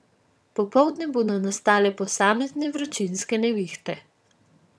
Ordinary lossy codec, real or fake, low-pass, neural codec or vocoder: none; fake; none; vocoder, 22.05 kHz, 80 mel bands, HiFi-GAN